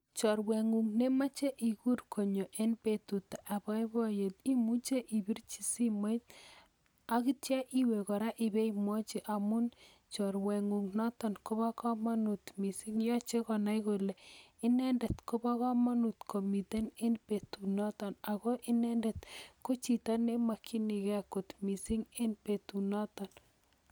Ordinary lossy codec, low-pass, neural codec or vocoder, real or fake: none; none; none; real